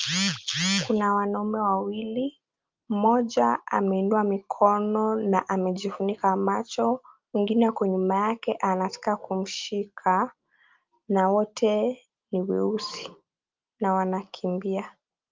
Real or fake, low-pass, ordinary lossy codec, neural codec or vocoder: real; 7.2 kHz; Opus, 32 kbps; none